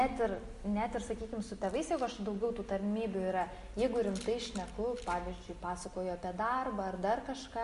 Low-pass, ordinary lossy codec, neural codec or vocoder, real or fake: 14.4 kHz; MP3, 48 kbps; none; real